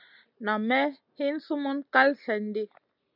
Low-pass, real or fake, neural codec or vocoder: 5.4 kHz; real; none